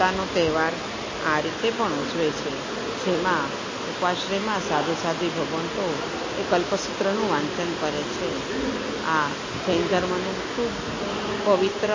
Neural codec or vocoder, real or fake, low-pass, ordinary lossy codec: none; real; 7.2 kHz; MP3, 32 kbps